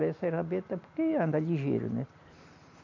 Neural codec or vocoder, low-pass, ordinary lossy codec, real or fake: none; 7.2 kHz; AAC, 48 kbps; real